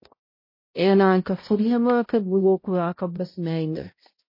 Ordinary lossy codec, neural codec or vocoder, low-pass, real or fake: MP3, 24 kbps; codec, 16 kHz, 0.5 kbps, X-Codec, HuBERT features, trained on balanced general audio; 5.4 kHz; fake